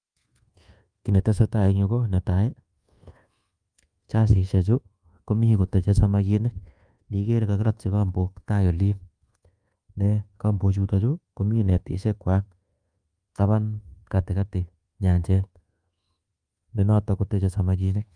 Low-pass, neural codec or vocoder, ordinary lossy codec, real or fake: 9.9 kHz; codec, 24 kHz, 1.2 kbps, DualCodec; Opus, 32 kbps; fake